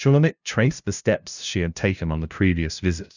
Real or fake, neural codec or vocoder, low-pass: fake; codec, 16 kHz, 0.5 kbps, FunCodec, trained on LibriTTS, 25 frames a second; 7.2 kHz